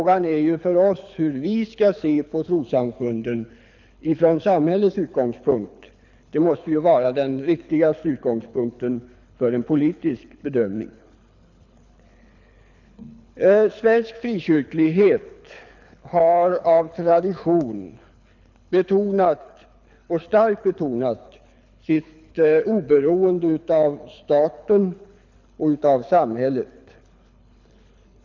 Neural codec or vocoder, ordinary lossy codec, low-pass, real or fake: codec, 24 kHz, 6 kbps, HILCodec; none; 7.2 kHz; fake